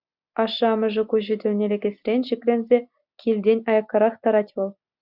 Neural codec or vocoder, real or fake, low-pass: none; real; 5.4 kHz